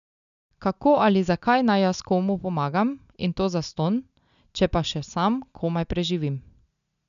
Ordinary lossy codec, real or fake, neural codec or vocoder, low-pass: none; real; none; 7.2 kHz